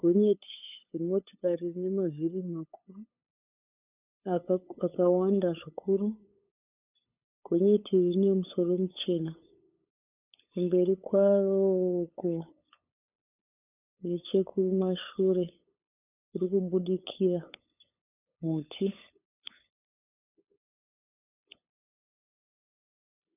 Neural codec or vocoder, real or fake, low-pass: codec, 16 kHz, 8 kbps, FunCodec, trained on LibriTTS, 25 frames a second; fake; 3.6 kHz